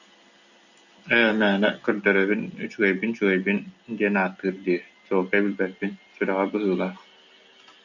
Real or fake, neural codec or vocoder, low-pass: real; none; 7.2 kHz